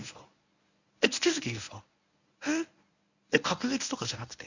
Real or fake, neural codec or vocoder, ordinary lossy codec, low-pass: fake; codec, 24 kHz, 0.9 kbps, WavTokenizer, medium speech release version 1; none; 7.2 kHz